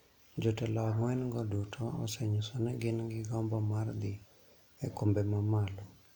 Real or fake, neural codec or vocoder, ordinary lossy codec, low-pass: real; none; none; 19.8 kHz